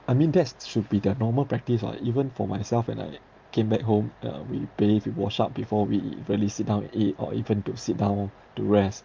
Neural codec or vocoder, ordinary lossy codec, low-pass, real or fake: none; Opus, 32 kbps; 7.2 kHz; real